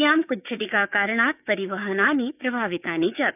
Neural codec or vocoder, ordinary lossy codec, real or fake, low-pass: codec, 16 kHz, 6 kbps, DAC; none; fake; 3.6 kHz